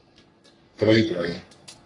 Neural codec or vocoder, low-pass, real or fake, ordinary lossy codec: codec, 44.1 kHz, 3.4 kbps, Pupu-Codec; 10.8 kHz; fake; AAC, 32 kbps